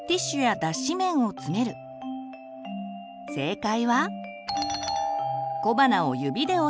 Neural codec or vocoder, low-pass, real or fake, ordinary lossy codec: none; none; real; none